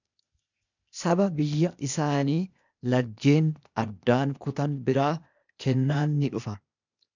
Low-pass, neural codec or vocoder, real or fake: 7.2 kHz; codec, 16 kHz, 0.8 kbps, ZipCodec; fake